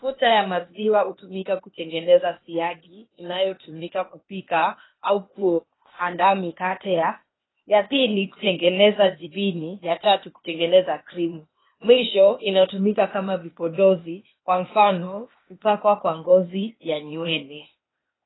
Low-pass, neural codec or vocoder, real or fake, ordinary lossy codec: 7.2 kHz; codec, 16 kHz, 0.8 kbps, ZipCodec; fake; AAC, 16 kbps